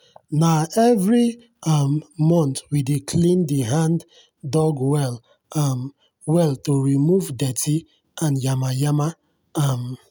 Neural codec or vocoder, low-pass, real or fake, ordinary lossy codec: none; none; real; none